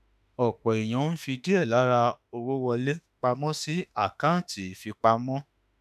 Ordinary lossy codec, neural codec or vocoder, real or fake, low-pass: none; autoencoder, 48 kHz, 32 numbers a frame, DAC-VAE, trained on Japanese speech; fake; 14.4 kHz